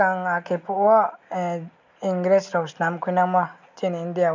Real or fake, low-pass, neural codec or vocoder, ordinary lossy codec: real; 7.2 kHz; none; MP3, 64 kbps